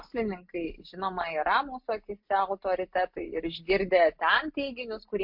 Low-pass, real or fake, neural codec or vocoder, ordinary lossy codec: 5.4 kHz; real; none; MP3, 48 kbps